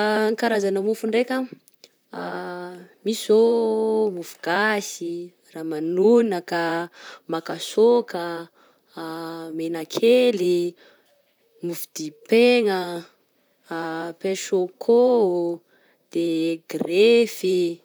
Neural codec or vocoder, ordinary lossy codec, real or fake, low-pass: vocoder, 44.1 kHz, 128 mel bands, Pupu-Vocoder; none; fake; none